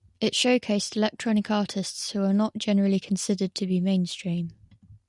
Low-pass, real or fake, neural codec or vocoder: 10.8 kHz; real; none